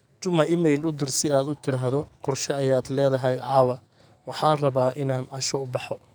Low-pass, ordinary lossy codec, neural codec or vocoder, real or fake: none; none; codec, 44.1 kHz, 2.6 kbps, SNAC; fake